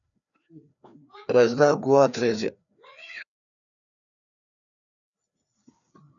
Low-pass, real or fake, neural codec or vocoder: 7.2 kHz; fake; codec, 16 kHz, 2 kbps, FreqCodec, larger model